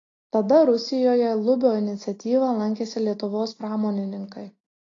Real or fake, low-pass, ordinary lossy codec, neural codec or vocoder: real; 7.2 kHz; AAC, 32 kbps; none